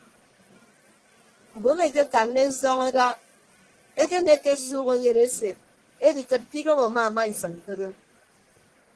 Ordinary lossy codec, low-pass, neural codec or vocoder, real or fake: Opus, 16 kbps; 10.8 kHz; codec, 44.1 kHz, 1.7 kbps, Pupu-Codec; fake